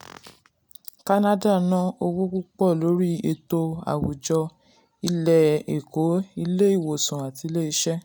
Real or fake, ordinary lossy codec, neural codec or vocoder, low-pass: real; none; none; none